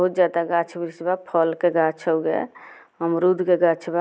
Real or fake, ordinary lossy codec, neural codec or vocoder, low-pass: real; none; none; none